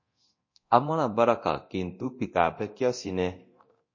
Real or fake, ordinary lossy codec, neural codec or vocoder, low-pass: fake; MP3, 32 kbps; codec, 24 kHz, 0.9 kbps, DualCodec; 7.2 kHz